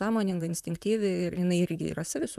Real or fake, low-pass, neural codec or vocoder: fake; 14.4 kHz; vocoder, 44.1 kHz, 128 mel bands, Pupu-Vocoder